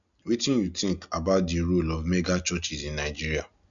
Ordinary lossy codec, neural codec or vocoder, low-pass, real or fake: none; none; 7.2 kHz; real